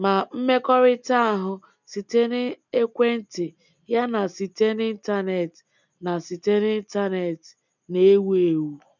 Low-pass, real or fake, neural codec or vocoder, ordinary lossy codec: 7.2 kHz; real; none; none